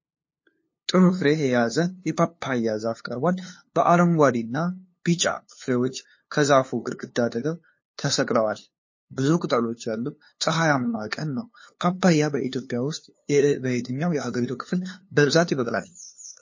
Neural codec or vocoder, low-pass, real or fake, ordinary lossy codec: codec, 16 kHz, 2 kbps, FunCodec, trained on LibriTTS, 25 frames a second; 7.2 kHz; fake; MP3, 32 kbps